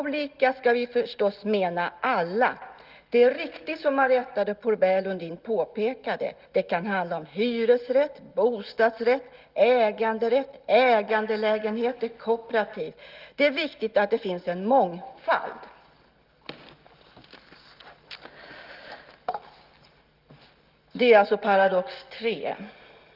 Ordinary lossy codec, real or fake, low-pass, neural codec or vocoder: Opus, 32 kbps; real; 5.4 kHz; none